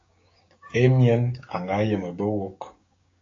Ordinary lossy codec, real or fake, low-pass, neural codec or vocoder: AAC, 32 kbps; fake; 7.2 kHz; codec, 16 kHz, 6 kbps, DAC